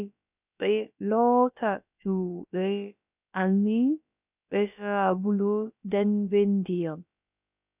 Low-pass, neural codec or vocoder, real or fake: 3.6 kHz; codec, 16 kHz, about 1 kbps, DyCAST, with the encoder's durations; fake